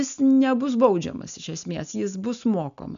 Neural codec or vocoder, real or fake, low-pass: none; real; 7.2 kHz